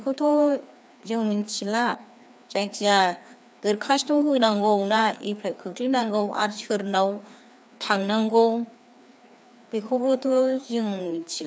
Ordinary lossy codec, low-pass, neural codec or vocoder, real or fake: none; none; codec, 16 kHz, 2 kbps, FreqCodec, larger model; fake